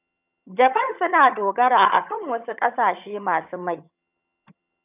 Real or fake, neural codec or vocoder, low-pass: fake; vocoder, 22.05 kHz, 80 mel bands, HiFi-GAN; 3.6 kHz